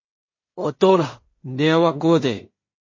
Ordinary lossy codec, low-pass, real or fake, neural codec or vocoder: MP3, 32 kbps; 7.2 kHz; fake; codec, 16 kHz in and 24 kHz out, 0.4 kbps, LongCat-Audio-Codec, two codebook decoder